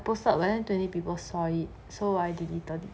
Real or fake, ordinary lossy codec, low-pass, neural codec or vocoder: real; none; none; none